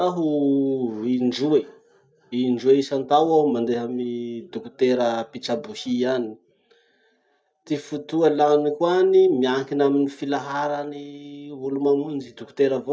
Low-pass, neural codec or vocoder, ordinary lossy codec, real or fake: none; none; none; real